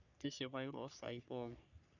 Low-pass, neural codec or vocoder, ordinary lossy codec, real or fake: 7.2 kHz; codec, 44.1 kHz, 3.4 kbps, Pupu-Codec; none; fake